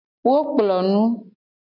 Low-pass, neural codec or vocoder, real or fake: 5.4 kHz; none; real